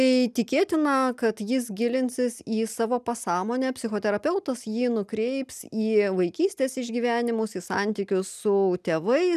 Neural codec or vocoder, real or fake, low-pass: none; real; 14.4 kHz